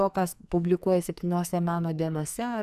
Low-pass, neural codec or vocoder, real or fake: 14.4 kHz; codec, 44.1 kHz, 2.6 kbps, SNAC; fake